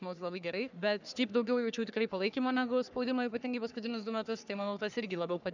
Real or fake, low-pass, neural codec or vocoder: fake; 7.2 kHz; codec, 44.1 kHz, 3.4 kbps, Pupu-Codec